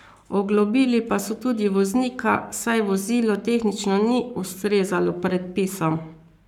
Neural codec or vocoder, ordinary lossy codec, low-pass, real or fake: codec, 44.1 kHz, 7.8 kbps, Pupu-Codec; none; 19.8 kHz; fake